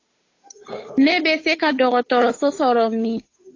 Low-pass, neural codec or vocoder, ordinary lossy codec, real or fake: 7.2 kHz; codec, 16 kHz, 8 kbps, FunCodec, trained on Chinese and English, 25 frames a second; AAC, 32 kbps; fake